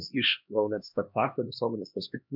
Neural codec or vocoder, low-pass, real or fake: codec, 16 kHz, 2 kbps, X-Codec, HuBERT features, trained on LibriSpeech; 5.4 kHz; fake